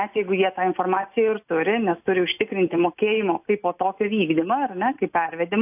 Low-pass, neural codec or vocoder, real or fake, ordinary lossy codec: 3.6 kHz; none; real; AAC, 32 kbps